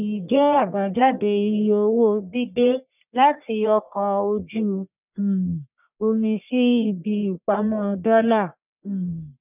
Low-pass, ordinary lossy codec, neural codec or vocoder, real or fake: 3.6 kHz; none; codec, 44.1 kHz, 1.7 kbps, Pupu-Codec; fake